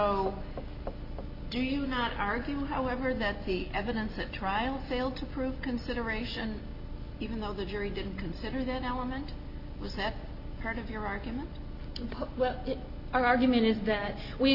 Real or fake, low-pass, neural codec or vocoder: real; 5.4 kHz; none